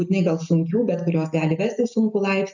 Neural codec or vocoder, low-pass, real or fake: none; 7.2 kHz; real